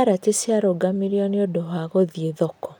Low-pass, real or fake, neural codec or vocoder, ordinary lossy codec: none; real; none; none